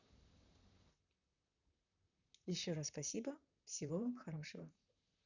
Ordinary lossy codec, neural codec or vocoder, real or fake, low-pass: none; vocoder, 44.1 kHz, 128 mel bands, Pupu-Vocoder; fake; 7.2 kHz